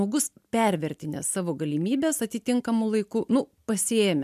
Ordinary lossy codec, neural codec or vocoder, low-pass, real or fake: MP3, 96 kbps; none; 14.4 kHz; real